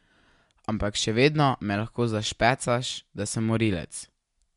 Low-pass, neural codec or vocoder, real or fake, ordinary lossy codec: 10.8 kHz; none; real; MP3, 64 kbps